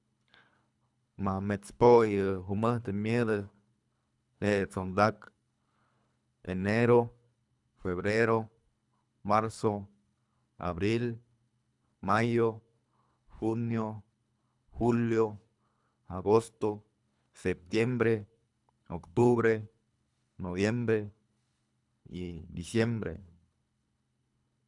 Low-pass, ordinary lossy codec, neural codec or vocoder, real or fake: 10.8 kHz; none; codec, 24 kHz, 3 kbps, HILCodec; fake